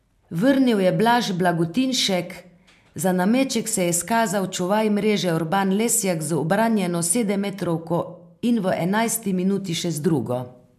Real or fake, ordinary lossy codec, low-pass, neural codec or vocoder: real; MP3, 96 kbps; 14.4 kHz; none